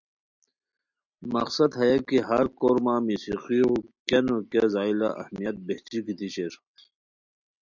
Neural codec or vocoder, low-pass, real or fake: none; 7.2 kHz; real